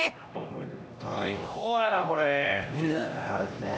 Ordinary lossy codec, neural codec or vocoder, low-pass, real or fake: none; codec, 16 kHz, 1 kbps, X-Codec, HuBERT features, trained on LibriSpeech; none; fake